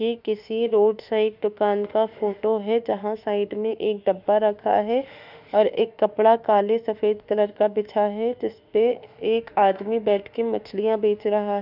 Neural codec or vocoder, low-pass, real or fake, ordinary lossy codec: autoencoder, 48 kHz, 32 numbers a frame, DAC-VAE, trained on Japanese speech; 5.4 kHz; fake; none